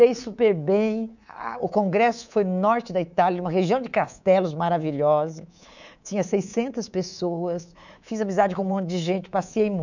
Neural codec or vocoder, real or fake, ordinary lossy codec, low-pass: codec, 24 kHz, 3.1 kbps, DualCodec; fake; none; 7.2 kHz